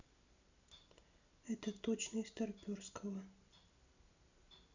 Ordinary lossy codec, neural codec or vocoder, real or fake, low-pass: none; none; real; 7.2 kHz